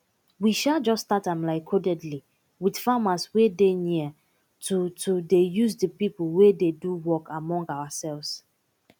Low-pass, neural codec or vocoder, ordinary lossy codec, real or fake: 19.8 kHz; none; none; real